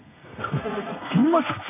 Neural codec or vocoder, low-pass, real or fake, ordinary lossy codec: codec, 32 kHz, 1.9 kbps, SNAC; 3.6 kHz; fake; none